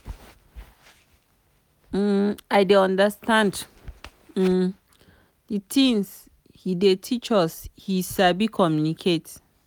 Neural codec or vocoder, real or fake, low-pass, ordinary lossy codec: none; real; none; none